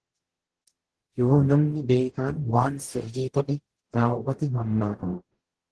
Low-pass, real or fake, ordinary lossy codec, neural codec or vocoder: 10.8 kHz; fake; Opus, 16 kbps; codec, 44.1 kHz, 0.9 kbps, DAC